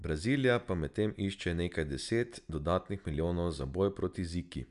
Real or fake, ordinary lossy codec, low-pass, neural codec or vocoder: real; none; 10.8 kHz; none